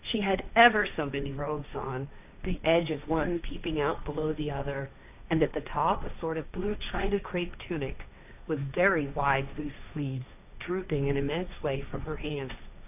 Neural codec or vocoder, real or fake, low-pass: codec, 16 kHz, 1.1 kbps, Voila-Tokenizer; fake; 3.6 kHz